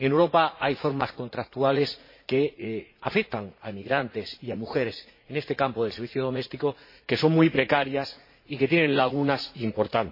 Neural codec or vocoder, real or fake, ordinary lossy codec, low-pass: vocoder, 44.1 kHz, 80 mel bands, Vocos; fake; MP3, 24 kbps; 5.4 kHz